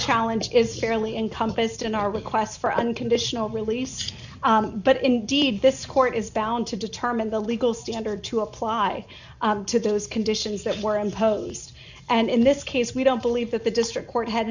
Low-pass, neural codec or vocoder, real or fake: 7.2 kHz; none; real